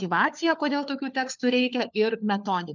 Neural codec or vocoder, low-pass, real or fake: codec, 16 kHz, 4 kbps, FunCodec, trained on LibriTTS, 50 frames a second; 7.2 kHz; fake